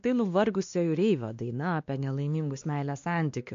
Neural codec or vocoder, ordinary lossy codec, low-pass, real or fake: codec, 16 kHz, 2 kbps, X-Codec, WavLM features, trained on Multilingual LibriSpeech; MP3, 48 kbps; 7.2 kHz; fake